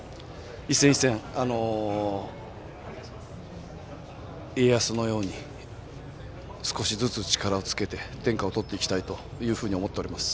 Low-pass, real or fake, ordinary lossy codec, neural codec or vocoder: none; real; none; none